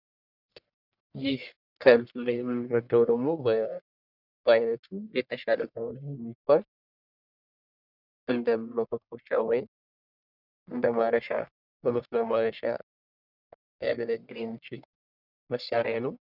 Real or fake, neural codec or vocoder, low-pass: fake; codec, 44.1 kHz, 1.7 kbps, Pupu-Codec; 5.4 kHz